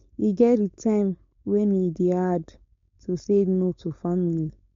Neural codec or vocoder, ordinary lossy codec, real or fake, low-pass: codec, 16 kHz, 4.8 kbps, FACodec; MP3, 48 kbps; fake; 7.2 kHz